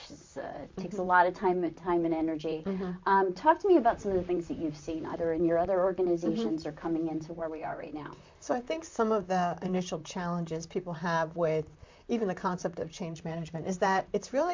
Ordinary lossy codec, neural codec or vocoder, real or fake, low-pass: MP3, 64 kbps; vocoder, 44.1 kHz, 128 mel bands, Pupu-Vocoder; fake; 7.2 kHz